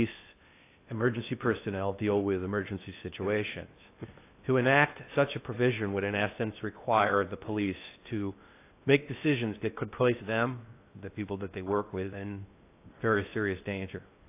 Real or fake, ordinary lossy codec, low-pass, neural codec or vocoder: fake; AAC, 24 kbps; 3.6 kHz; codec, 16 kHz in and 24 kHz out, 0.6 kbps, FocalCodec, streaming, 2048 codes